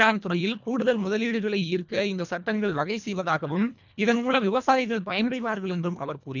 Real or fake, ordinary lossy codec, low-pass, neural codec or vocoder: fake; none; 7.2 kHz; codec, 24 kHz, 1.5 kbps, HILCodec